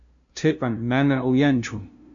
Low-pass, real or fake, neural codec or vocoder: 7.2 kHz; fake; codec, 16 kHz, 0.5 kbps, FunCodec, trained on LibriTTS, 25 frames a second